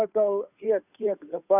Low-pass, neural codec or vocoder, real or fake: 3.6 kHz; codec, 16 kHz, 2 kbps, FunCodec, trained on Chinese and English, 25 frames a second; fake